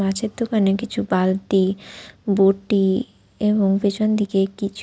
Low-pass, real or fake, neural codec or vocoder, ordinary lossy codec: none; real; none; none